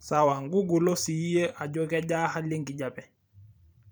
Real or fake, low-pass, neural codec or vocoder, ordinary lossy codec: fake; none; vocoder, 44.1 kHz, 128 mel bands every 512 samples, BigVGAN v2; none